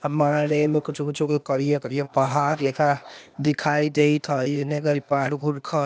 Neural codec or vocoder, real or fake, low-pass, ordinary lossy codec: codec, 16 kHz, 0.8 kbps, ZipCodec; fake; none; none